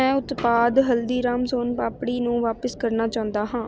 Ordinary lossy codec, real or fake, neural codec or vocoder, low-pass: none; real; none; none